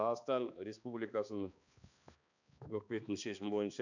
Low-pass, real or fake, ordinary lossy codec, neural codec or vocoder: 7.2 kHz; fake; none; codec, 16 kHz, 2 kbps, X-Codec, HuBERT features, trained on balanced general audio